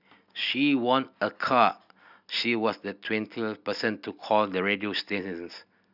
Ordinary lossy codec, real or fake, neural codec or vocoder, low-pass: none; real; none; 5.4 kHz